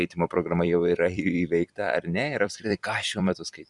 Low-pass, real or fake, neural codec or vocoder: 10.8 kHz; fake; vocoder, 44.1 kHz, 128 mel bands every 256 samples, BigVGAN v2